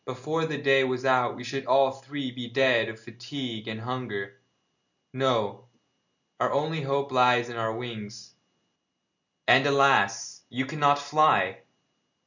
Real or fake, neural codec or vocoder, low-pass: real; none; 7.2 kHz